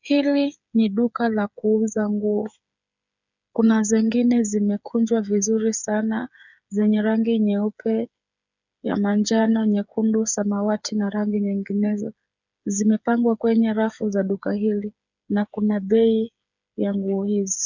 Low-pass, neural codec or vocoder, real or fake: 7.2 kHz; codec, 16 kHz, 8 kbps, FreqCodec, smaller model; fake